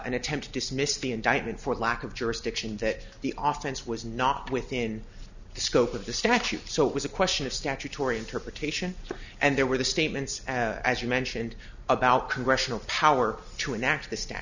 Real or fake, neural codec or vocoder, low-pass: real; none; 7.2 kHz